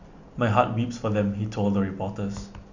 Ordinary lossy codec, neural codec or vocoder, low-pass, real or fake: none; none; 7.2 kHz; real